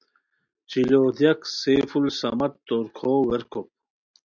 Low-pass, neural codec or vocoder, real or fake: 7.2 kHz; none; real